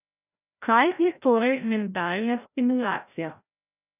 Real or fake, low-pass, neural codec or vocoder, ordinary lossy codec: fake; 3.6 kHz; codec, 16 kHz, 0.5 kbps, FreqCodec, larger model; none